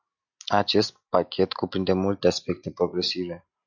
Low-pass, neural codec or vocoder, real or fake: 7.2 kHz; none; real